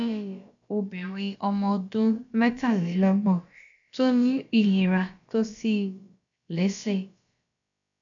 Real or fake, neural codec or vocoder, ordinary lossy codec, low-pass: fake; codec, 16 kHz, about 1 kbps, DyCAST, with the encoder's durations; none; 7.2 kHz